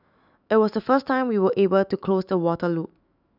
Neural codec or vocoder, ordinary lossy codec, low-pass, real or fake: autoencoder, 48 kHz, 128 numbers a frame, DAC-VAE, trained on Japanese speech; none; 5.4 kHz; fake